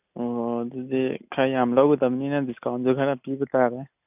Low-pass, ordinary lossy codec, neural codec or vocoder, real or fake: 3.6 kHz; none; none; real